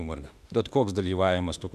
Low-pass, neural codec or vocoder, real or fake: 14.4 kHz; autoencoder, 48 kHz, 32 numbers a frame, DAC-VAE, trained on Japanese speech; fake